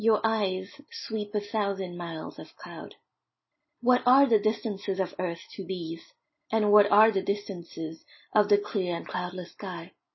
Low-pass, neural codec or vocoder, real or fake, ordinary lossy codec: 7.2 kHz; none; real; MP3, 24 kbps